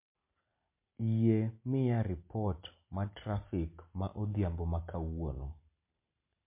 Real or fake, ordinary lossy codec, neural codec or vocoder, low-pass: real; MP3, 24 kbps; none; 3.6 kHz